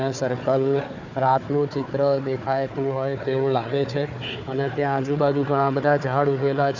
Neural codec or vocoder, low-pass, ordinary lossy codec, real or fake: codec, 16 kHz, 4 kbps, FunCodec, trained on Chinese and English, 50 frames a second; 7.2 kHz; none; fake